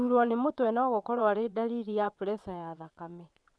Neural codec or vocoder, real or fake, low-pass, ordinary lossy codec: vocoder, 22.05 kHz, 80 mel bands, WaveNeXt; fake; none; none